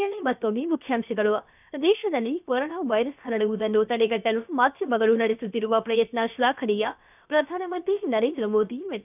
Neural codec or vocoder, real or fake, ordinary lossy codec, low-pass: codec, 16 kHz, about 1 kbps, DyCAST, with the encoder's durations; fake; none; 3.6 kHz